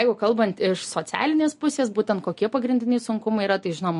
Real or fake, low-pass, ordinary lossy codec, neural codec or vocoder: real; 10.8 kHz; MP3, 48 kbps; none